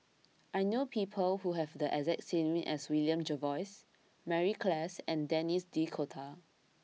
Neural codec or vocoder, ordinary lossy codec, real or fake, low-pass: none; none; real; none